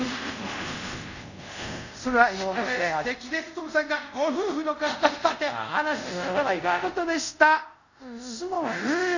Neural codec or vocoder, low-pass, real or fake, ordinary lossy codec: codec, 24 kHz, 0.5 kbps, DualCodec; 7.2 kHz; fake; none